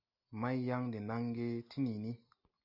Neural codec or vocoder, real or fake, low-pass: none; real; 5.4 kHz